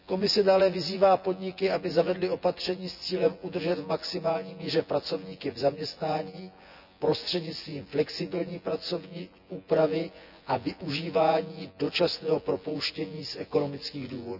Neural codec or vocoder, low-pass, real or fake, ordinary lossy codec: vocoder, 24 kHz, 100 mel bands, Vocos; 5.4 kHz; fake; none